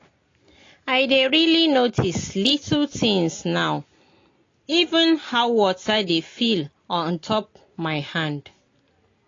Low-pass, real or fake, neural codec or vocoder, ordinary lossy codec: 7.2 kHz; real; none; AAC, 32 kbps